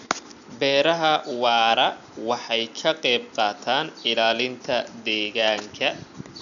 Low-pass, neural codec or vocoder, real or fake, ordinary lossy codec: 7.2 kHz; none; real; none